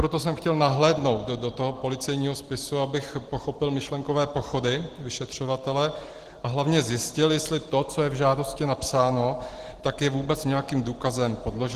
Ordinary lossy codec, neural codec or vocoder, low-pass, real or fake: Opus, 16 kbps; none; 14.4 kHz; real